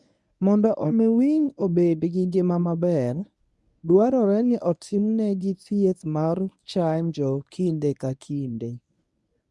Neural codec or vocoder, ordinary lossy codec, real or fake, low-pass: codec, 24 kHz, 0.9 kbps, WavTokenizer, medium speech release version 1; none; fake; none